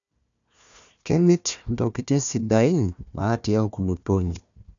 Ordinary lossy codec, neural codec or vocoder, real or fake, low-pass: none; codec, 16 kHz, 1 kbps, FunCodec, trained on Chinese and English, 50 frames a second; fake; 7.2 kHz